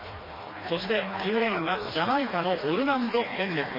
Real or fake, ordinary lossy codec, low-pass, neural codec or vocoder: fake; MP3, 24 kbps; 5.4 kHz; codec, 16 kHz, 2 kbps, FreqCodec, smaller model